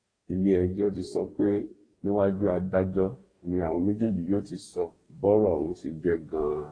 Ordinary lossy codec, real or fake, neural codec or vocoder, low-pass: AAC, 32 kbps; fake; codec, 44.1 kHz, 2.6 kbps, DAC; 9.9 kHz